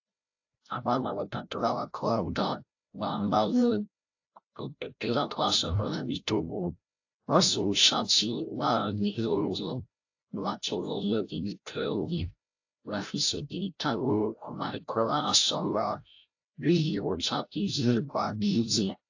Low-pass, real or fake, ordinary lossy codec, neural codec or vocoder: 7.2 kHz; fake; AAC, 48 kbps; codec, 16 kHz, 0.5 kbps, FreqCodec, larger model